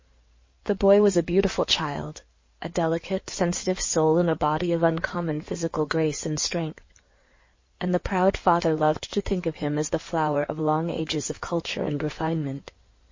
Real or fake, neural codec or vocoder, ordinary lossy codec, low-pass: fake; codec, 16 kHz in and 24 kHz out, 2.2 kbps, FireRedTTS-2 codec; MP3, 32 kbps; 7.2 kHz